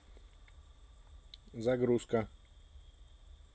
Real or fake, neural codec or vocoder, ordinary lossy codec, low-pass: real; none; none; none